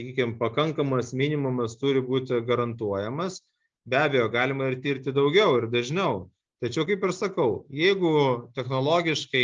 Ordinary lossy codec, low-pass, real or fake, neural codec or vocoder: Opus, 24 kbps; 7.2 kHz; real; none